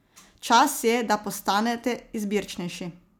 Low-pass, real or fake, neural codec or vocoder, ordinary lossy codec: none; real; none; none